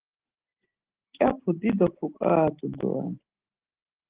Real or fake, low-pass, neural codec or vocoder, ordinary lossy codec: real; 3.6 kHz; none; Opus, 16 kbps